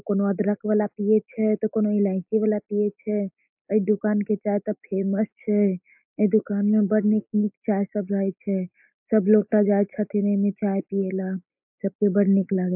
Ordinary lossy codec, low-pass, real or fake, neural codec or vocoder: MP3, 32 kbps; 3.6 kHz; real; none